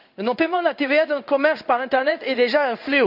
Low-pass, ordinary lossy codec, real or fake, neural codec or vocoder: 5.4 kHz; none; fake; codec, 16 kHz in and 24 kHz out, 1 kbps, XY-Tokenizer